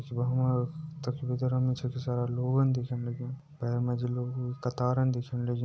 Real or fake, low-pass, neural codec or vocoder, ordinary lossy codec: real; none; none; none